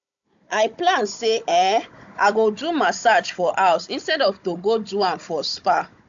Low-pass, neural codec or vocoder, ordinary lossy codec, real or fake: 7.2 kHz; codec, 16 kHz, 16 kbps, FunCodec, trained on Chinese and English, 50 frames a second; AAC, 64 kbps; fake